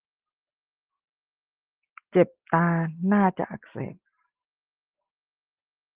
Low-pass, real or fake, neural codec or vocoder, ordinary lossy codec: 3.6 kHz; real; none; Opus, 16 kbps